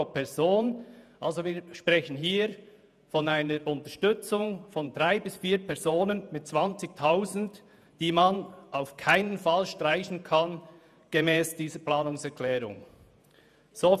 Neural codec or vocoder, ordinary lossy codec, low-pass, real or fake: vocoder, 48 kHz, 128 mel bands, Vocos; none; 14.4 kHz; fake